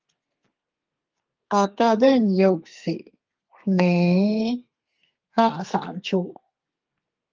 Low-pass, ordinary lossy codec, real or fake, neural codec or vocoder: 7.2 kHz; Opus, 24 kbps; fake; codec, 32 kHz, 1.9 kbps, SNAC